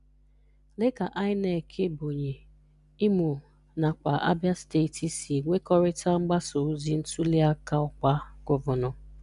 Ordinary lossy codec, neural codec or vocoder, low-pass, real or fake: MP3, 96 kbps; none; 10.8 kHz; real